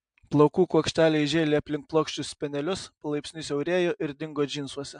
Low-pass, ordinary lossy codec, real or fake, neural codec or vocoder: 9.9 kHz; MP3, 64 kbps; real; none